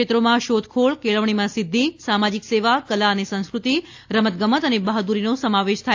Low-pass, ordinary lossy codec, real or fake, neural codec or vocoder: 7.2 kHz; AAC, 48 kbps; real; none